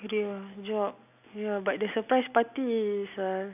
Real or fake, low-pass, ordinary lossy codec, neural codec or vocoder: real; 3.6 kHz; AAC, 32 kbps; none